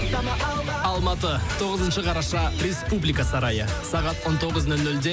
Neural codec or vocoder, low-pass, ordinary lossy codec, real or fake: none; none; none; real